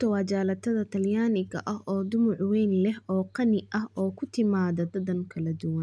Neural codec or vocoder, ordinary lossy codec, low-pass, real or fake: none; none; 9.9 kHz; real